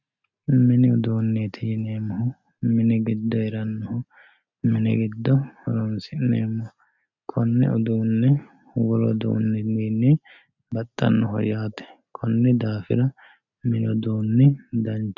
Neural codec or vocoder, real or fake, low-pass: none; real; 7.2 kHz